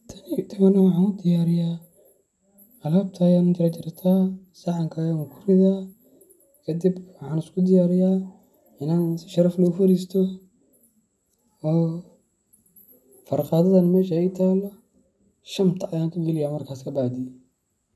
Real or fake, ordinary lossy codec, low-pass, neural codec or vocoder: real; none; none; none